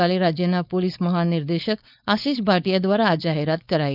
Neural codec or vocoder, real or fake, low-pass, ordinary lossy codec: codec, 16 kHz, 4.8 kbps, FACodec; fake; 5.4 kHz; none